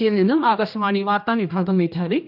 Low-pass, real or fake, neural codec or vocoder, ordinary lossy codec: 5.4 kHz; fake; codec, 16 kHz, 1 kbps, X-Codec, HuBERT features, trained on general audio; none